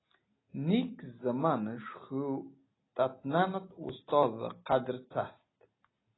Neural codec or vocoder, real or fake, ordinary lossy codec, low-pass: none; real; AAC, 16 kbps; 7.2 kHz